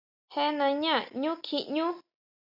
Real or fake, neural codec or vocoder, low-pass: real; none; 5.4 kHz